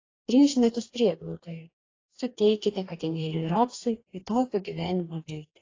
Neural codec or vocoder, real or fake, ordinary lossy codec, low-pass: codec, 44.1 kHz, 2.6 kbps, DAC; fake; AAC, 32 kbps; 7.2 kHz